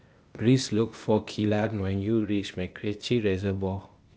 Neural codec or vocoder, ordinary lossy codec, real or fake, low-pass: codec, 16 kHz, 0.8 kbps, ZipCodec; none; fake; none